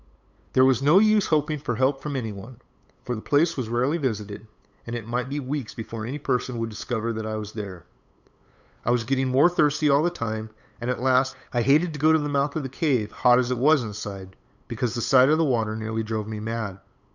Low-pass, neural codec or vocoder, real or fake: 7.2 kHz; codec, 16 kHz, 8 kbps, FunCodec, trained on LibriTTS, 25 frames a second; fake